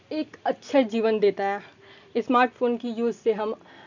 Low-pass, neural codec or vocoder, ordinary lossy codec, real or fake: 7.2 kHz; none; none; real